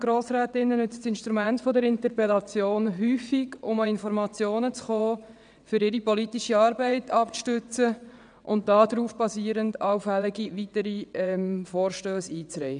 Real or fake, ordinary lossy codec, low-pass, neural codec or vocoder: fake; none; 9.9 kHz; vocoder, 22.05 kHz, 80 mel bands, WaveNeXt